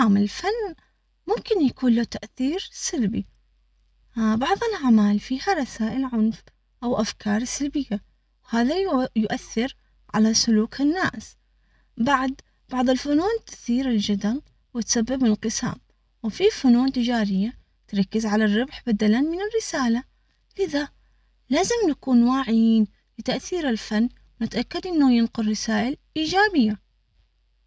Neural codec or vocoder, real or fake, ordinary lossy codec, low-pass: none; real; none; none